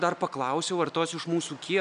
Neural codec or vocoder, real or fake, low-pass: none; real; 9.9 kHz